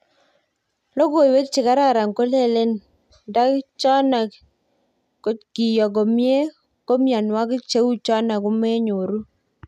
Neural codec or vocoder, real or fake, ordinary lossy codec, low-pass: none; real; none; 14.4 kHz